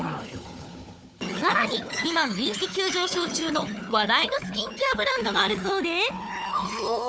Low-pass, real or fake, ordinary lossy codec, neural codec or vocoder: none; fake; none; codec, 16 kHz, 4 kbps, FunCodec, trained on Chinese and English, 50 frames a second